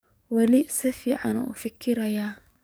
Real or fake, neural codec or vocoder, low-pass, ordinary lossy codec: fake; codec, 44.1 kHz, 7.8 kbps, DAC; none; none